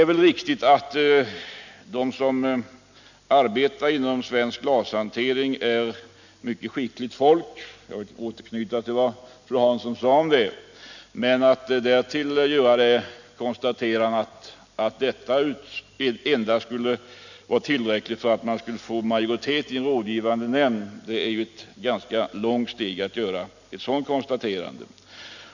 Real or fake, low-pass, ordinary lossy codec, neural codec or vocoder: real; 7.2 kHz; none; none